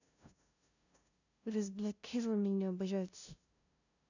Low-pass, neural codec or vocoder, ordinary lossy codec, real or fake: 7.2 kHz; codec, 16 kHz, 0.5 kbps, FunCodec, trained on LibriTTS, 25 frames a second; none; fake